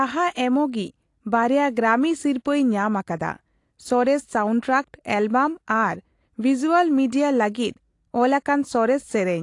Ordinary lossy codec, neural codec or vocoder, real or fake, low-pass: AAC, 48 kbps; none; real; 10.8 kHz